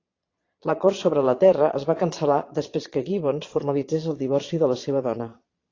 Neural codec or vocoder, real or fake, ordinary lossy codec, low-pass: vocoder, 22.05 kHz, 80 mel bands, Vocos; fake; AAC, 32 kbps; 7.2 kHz